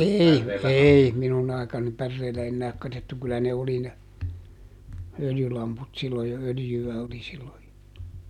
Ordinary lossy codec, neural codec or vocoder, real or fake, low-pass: none; none; real; 19.8 kHz